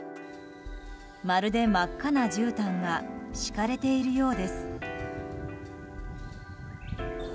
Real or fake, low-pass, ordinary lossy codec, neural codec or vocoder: real; none; none; none